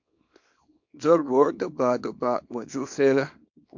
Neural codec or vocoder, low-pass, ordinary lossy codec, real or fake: codec, 24 kHz, 0.9 kbps, WavTokenizer, small release; 7.2 kHz; MP3, 48 kbps; fake